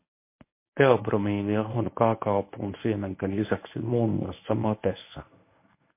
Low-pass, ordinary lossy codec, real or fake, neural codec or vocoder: 3.6 kHz; MP3, 24 kbps; fake; codec, 24 kHz, 0.9 kbps, WavTokenizer, medium speech release version 1